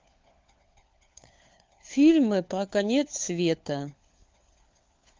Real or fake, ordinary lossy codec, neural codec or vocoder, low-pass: fake; Opus, 24 kbps; codec, 16 kHz, 4 kbps, FunCodec, trained on LibriTTS, 50 frames a second; 7.2 kHz